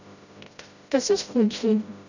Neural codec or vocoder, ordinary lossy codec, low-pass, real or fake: codec, 16 kHz, 0.5 kbps, FreqCodec, smaller model; none; 7.2 kHz; fake